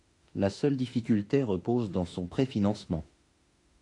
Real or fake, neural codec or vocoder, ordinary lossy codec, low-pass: fake; autoencoder, 48 kHz, 32 numbers a frame, DAC-VAE, trained on Japanese speech; AAC, 48 kbps; 10.8 kHz